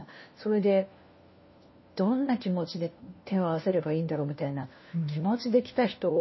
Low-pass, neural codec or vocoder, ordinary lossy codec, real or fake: 7.2 kHz; codec, 16 kHz, 1 kbps, FunCodec, trained on LibriTTS, 50 frames a second; MP3, 24 kbps; fake